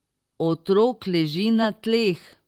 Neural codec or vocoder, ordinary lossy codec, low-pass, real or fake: vocoder, 44.1 kHz, 128 mel bands, Pupu-Vocoder; Opus, 24 kbps; 19.8 kHz; fake